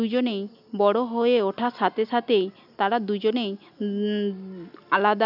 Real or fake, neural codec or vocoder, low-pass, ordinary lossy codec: real; none; 5.4 kHz; none